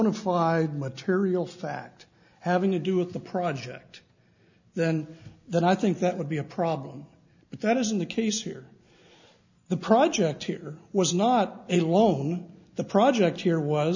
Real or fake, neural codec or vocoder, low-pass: real; none; 7.2 kHz